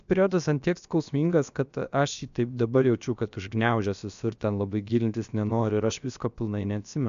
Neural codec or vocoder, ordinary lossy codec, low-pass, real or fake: codec, 16 kHz, about 1 kbps, DyCAST, with the encoder's durations; AAC, 96 kbps; 7.2 kHz; fake